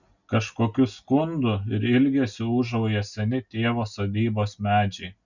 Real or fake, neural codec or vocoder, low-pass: real; none; 7.2 kHz